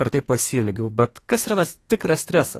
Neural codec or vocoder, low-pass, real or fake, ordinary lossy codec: codec, 32 kHz, 1.9 kbps, SNAC; 14.4 kHz; fake; AAC, 48 kbps